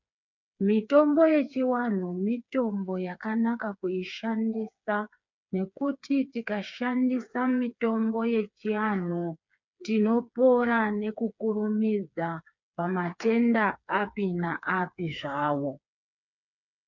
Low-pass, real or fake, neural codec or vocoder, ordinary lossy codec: 7.2 kHz; fake; codec, 16 kHz, 4 kbps, FreqCodec, smaller model; MP3, 64 kbps